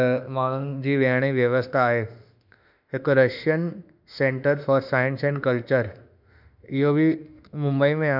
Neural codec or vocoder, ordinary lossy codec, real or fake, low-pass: autoencoder, 48 kHz, 32 numbers a frame, DAC-VAE, trained on Japanese speech; none; fake; 5.4 kHz